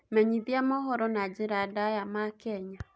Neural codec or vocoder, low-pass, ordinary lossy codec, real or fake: none; none; none; real